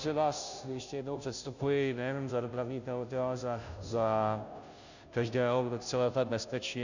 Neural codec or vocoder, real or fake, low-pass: codec, 16 kHz, 0.5 kbps, FunCodec, trained on Chinese and English, 25 frames a second; fake; 7.2 kHz